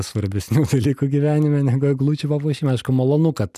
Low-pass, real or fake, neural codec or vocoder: 14.4 kHz; real; none